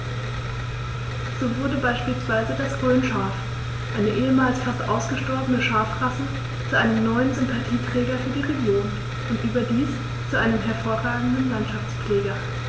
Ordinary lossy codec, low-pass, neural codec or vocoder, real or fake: none; none; none; real